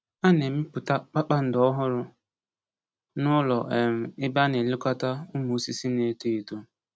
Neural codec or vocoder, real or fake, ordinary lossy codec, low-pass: none; real; none; none